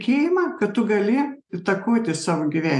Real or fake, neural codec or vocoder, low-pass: real; none; 10.8 kHz